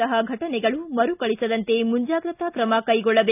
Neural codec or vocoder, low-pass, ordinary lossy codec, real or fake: none; 3.6 kHz; none; real